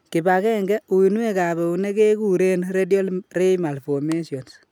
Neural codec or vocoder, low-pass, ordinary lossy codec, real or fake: none; 19.8 kHz; none; real